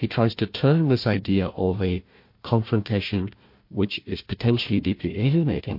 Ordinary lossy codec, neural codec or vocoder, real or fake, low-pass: MP3, 32 kbps; codec, 16 kHz, 1 kbps, FunCodec, trained on Chinese and English, 50 frames a second; fake; 5.4 kHz